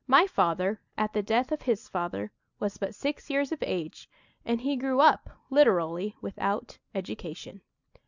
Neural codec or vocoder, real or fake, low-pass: none; real; 7.2 kHz